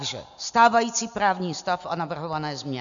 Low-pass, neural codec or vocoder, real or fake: 7.2 kHz; none; real